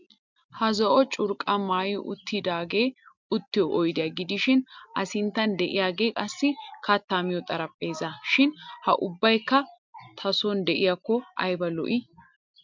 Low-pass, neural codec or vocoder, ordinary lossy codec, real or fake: 7.2 kHz; none; MP3, 64 kbps; real